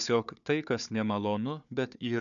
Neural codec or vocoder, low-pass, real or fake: codec, 16 kHz, 4 kbps, FunCodec, trained on Chinese and English, 50 frames a second; 7.2 kHz; fake